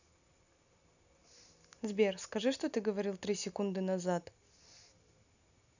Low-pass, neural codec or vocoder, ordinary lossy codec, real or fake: 7.2 kHz; none; none; real